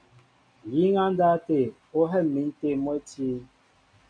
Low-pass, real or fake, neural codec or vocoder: 9.9 kHz; real; none